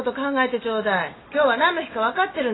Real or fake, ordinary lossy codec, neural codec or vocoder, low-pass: real; AAC, 16 kbps; none; 7.2 kHz